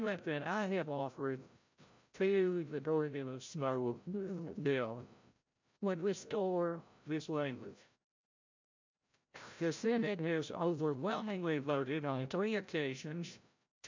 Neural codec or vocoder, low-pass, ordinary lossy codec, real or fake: codec, 16 kHz, 0.5 kbps, FreqCodec, larger model; 7.2 kHz; MP3, 64 kbps; fake